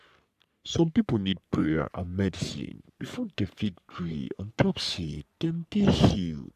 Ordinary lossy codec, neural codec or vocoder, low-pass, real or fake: AAC, 96 kbps; codec, 44.1 kHz, 3.4 kbps, Pupu-Codec; 14.4 kHz; fake